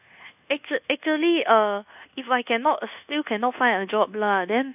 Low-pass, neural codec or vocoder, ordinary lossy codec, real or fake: 3.6 kHz; codec, 24 kHz, 1.2 kbps, DualCodec; none; fake